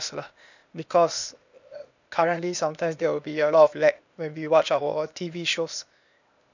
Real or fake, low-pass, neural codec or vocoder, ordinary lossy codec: fake; 7.2 kHz; codec, 16 kHz, 0.8 kbps, ZipCodec; none